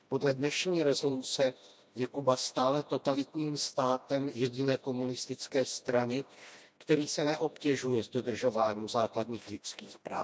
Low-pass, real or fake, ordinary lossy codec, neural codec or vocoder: none; fake; none; codec, 16 kHz, 1 kbps, FreqCodec, smaller model